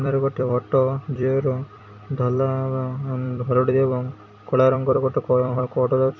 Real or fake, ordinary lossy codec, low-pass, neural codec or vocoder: fake; none; 7.2 kHz; vocoder, 44.1 kHz, 128 mel bands every 256 samples, BigVGAN v2